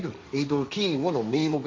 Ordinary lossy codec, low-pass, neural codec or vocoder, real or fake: none; none; codec, 16 kHz, 1.1 kbps, Voila-Tokenizer; fake